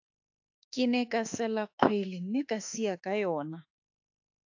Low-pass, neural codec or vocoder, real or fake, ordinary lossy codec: 7.2 kHz; autoencoder, 48 kHz, 32 numbers a frame, DAC-VAE, trained on Japanese speech; fake; AAC, 48 kbps